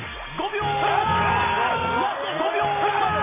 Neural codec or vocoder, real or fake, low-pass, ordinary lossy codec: none; real; 3.6 kHz; MP3, 16 kbps